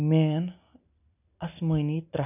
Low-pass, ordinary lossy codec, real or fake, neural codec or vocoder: 3.6 kHz; none; real; none